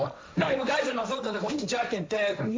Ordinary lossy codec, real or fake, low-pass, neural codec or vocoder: none; fake; none; codec, 16 kHz, 1.1 kbps, Voila-Tokenizer